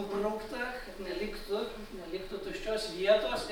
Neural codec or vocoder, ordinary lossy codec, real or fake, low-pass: none; MP3, 96 kbps; real; 19.8 kHz